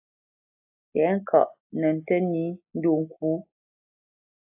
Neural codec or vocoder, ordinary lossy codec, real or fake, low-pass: none; AAC, 32 kbps; real; 3.6 kHz